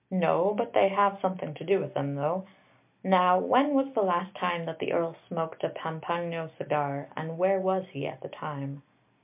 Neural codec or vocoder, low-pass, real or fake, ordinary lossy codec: none; 3.6 kHz; real; MP3, 32 kbps